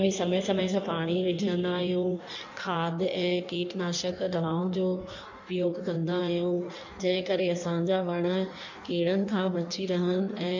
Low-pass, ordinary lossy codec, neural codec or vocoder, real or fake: 7.2 kHz; none; codec, 16 kHz in and 24 kHz out, 1.1 kbps, FireRedTTS-2 codec; fake